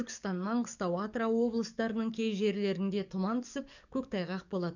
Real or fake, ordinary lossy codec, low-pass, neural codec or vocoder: fake; none; 7.2 kHz; codec, 44.1 kHz, 7.8 kbps, DAC